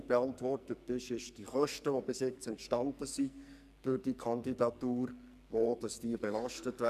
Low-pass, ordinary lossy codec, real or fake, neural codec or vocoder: 14.4 kHz; none; fake; codec, 44.1 kHz, 2.6 kbps, SNAC